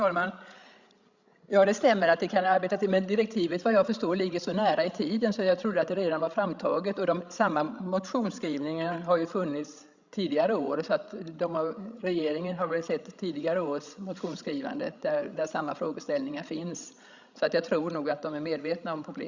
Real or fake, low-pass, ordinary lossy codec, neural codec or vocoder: fake; 7.2 kHz; Opus, 64 kbps; codec, 16 kHz, 16 kbps, FreqCodec, larger model